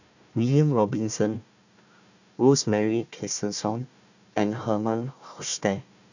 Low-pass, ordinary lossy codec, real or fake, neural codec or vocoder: 7.2 kHz; none; fake; codec, 16 kHz, 1 kbps, FunCodec, trained on Chinese and English, 50 frames a second